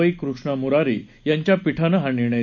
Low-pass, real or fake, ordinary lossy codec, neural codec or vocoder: 7.2 kHz; real; none; none